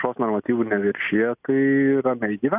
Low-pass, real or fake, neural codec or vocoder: 3.6 kHz; real; none